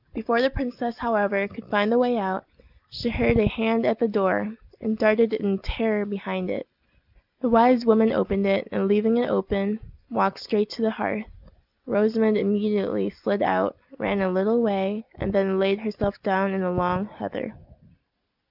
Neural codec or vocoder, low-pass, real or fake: none; 5.4 kHz; real